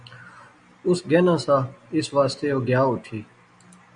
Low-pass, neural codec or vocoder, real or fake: 9.9 kHz; none; real